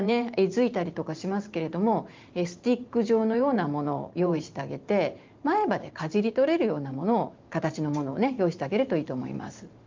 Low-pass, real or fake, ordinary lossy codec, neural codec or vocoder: 7.2 kHz; fake; Opus, 32 kbps; vocoder, 44.1 kHz, 128 mel bands every 512 samples, BigVGAN v2